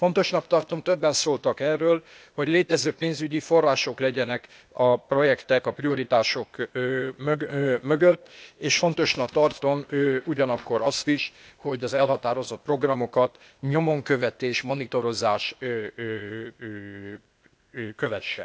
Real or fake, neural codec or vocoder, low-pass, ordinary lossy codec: fake; codec, 16 kHz, 0.8 kbps, ZipCodec; none; none